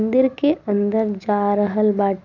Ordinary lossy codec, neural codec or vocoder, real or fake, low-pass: none; none; real; 7.2 kHz